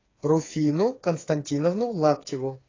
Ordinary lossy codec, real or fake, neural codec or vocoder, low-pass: AAC, 32 kbps; fake; codec, 16 kHz, 4 kbps, FreqCodec, smaller model; 7.2 kHz